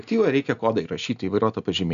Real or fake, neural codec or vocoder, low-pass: real; none; 7.2 kHz